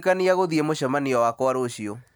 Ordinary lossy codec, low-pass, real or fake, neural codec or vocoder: none; none; real; none